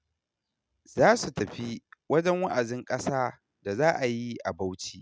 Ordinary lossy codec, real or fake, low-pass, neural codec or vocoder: none; real; none; none